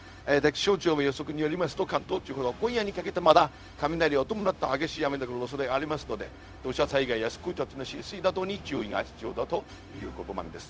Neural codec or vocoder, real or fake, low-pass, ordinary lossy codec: codec, 16 kHz, 0.4 kbps, LongCat-Audio-Codec; fake; none; none